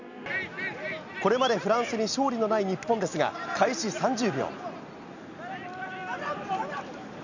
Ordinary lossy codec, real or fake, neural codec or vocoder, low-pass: none; real; none; 7.2 kHz